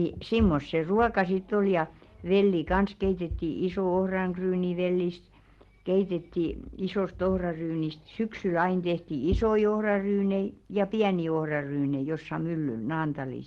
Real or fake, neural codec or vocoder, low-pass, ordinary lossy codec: real; none; 19.8 kHz; Opus, 16 kbps